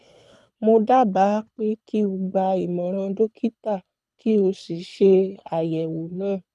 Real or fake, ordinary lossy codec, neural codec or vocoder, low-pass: fake; none; codec, 24 kHz, 6 kbps, HILCodec; none